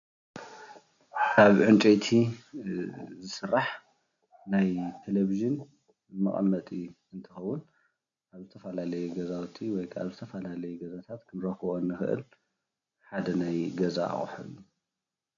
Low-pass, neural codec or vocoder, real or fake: 7.2 kHz; none; real